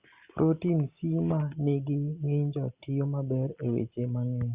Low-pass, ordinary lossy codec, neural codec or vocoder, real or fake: 3.6 kHz; Opus, 64 kbps; none; real